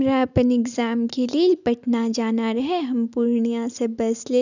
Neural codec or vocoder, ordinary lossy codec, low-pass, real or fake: none; none; 7.2 kHz; real